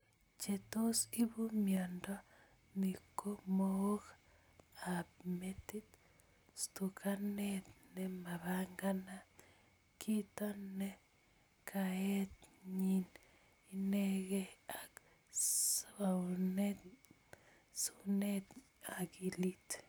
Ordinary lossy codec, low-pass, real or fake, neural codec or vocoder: none; none; real; none